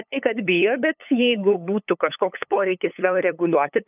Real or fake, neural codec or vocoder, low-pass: fake; codec, 16 kHz, 8 kbps, FunCodec, trained on LibriTTS, 25 frames a second; 3.6 kHz